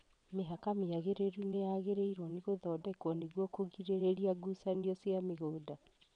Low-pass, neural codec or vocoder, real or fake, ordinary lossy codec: 9.9 kHz; vocoder, 22.05 kHz, 80 mel bands, WaveNeXt; fake; none